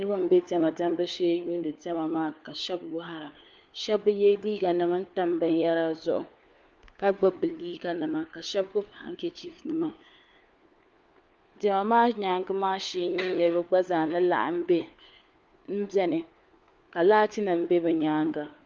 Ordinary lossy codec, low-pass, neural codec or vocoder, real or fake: Opus, 24 kbps; 7.2 kHz; codec, 16 kHz, 4 kbps, FunCodec, trained on LibriTTS, 50 frames a second; fake